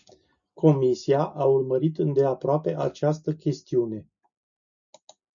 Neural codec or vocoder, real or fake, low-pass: none; real; 7.2 kHz